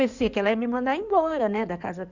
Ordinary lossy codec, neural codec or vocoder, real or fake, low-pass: Opus, 64 kbps; codec, 16 kHz in and 24 kHz out, 2.2 kbps, FireRedTTS-2 codec; fake; 7.2 kHz